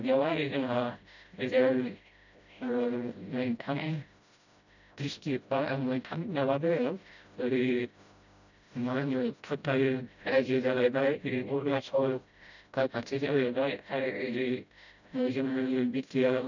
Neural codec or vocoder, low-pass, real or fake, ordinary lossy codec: codec, 16 kHz, 0.5 kbps, FreqCodec, smaller model; 7.2 kHz; fake; none